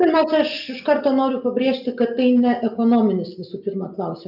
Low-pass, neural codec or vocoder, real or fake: 5.4 kHz; none; real